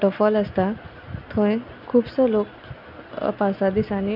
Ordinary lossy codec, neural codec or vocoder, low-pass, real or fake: none; none; 5.4 kHz; real